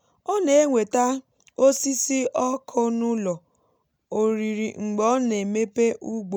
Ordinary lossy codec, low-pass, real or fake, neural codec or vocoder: none; none; real; none